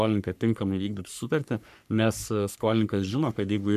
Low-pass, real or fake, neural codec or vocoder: 14.4 kHz; fake; codec, 44.1 kHz, 3.4 kbps, Pupu-Codec